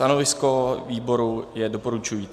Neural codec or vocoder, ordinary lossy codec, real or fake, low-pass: none; MP3, 96 kbps; real; 14.4 kHz